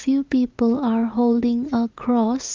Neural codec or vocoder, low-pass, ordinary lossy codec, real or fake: vocoder, 44.1 kHz, 80 mel bands, Vocos; 7.2 kHz; Opus, 24 kbps; fake